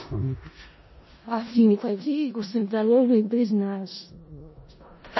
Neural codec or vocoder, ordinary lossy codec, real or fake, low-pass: codec, 16 kHz in and 24 kHz out, 0.4 kbps, LongCat-Audio-Codec, four codebook decoder; MP3, 24 kbps; fake; 7.2 kHz